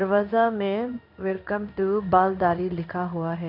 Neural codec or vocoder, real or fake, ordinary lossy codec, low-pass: codec, 16 kHz in and 24 kHz out, 1 kbps, XY-Tokenizer; fake; none; 5.4 kHz